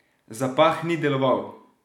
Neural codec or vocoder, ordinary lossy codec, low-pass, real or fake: none; none; 19.8 kHz; real